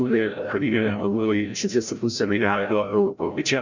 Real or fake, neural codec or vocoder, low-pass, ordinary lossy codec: fake; codec, 16 kHz, 0.5 kbps, FreqCodec, larger model; 7.2 kHz; MP3, 64 kbps